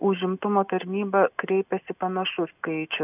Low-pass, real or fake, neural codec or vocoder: 3.6 kHz; real; none